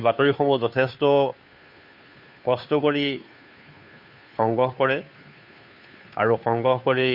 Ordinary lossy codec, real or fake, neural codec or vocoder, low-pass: none; fake; codec, 16 kHz, 2 kbps, FunCodec, trained on Chinese and English, 25 frames a second; 5.4 kHz